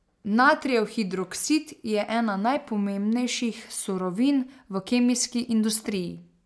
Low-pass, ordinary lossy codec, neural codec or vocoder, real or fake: none; none; none; real